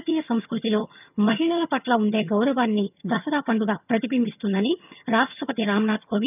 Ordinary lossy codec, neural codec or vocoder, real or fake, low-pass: none; vocoder, 22.05 kHz, 80 mel bands, HiFi-GAN; fake; 3.6 kHz